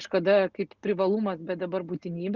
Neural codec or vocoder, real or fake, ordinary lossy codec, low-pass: none; real; Opus, 64 kbps; 7.2 kHz